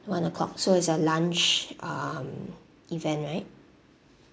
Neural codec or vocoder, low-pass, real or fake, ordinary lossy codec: none; none; real; none